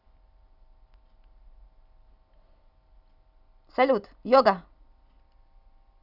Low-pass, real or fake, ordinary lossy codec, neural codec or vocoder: 5.4 kHz; real; none; none